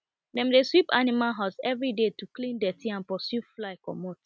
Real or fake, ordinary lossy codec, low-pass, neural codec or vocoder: real; none; none; none